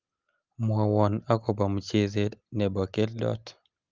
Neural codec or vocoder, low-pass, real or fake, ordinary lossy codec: none; 7.2 kHz; real; Opus, 24 kbps